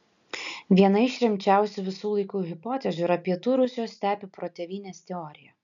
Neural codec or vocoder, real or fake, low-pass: none; real; 7.2 kHz